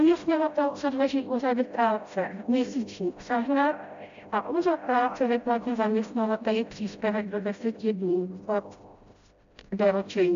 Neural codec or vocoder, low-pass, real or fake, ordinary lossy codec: codec, 16 kHz, 0.5 kbps, FreqCodec, smaller model; 7.2 kHz; fake; MP3, 64 kbps